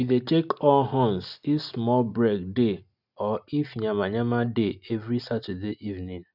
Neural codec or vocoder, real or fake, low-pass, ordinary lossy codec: none; real; 5.4 kHz; none